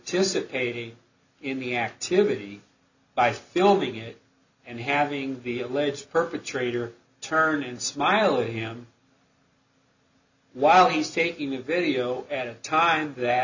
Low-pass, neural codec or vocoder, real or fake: 7.2 kHz; none; real